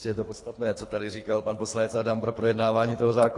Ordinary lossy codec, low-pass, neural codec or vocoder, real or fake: AAC, 48 kbps; 10.8 kHz; codec, 24 kHz, 3 kbps, HILCodec; fake